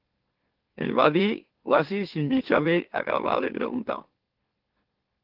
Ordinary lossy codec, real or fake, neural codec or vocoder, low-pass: Opus, 16 kbps; fake; autoencoder, 44.1 kHz, a latent of 192 numbers a frame, MeloTTS; 5.4 kHz